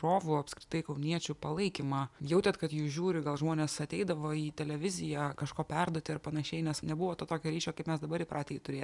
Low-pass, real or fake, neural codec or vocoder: 10.8 kHz; real; none